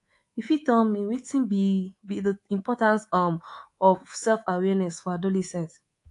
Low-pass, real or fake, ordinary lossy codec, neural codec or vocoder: 10.8 kHz; fake; AAC, 48 kbps; codec, 24 kHz, 3.1 kbps, DualCodec